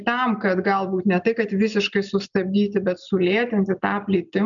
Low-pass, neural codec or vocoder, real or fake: 7.2 kHz; none; real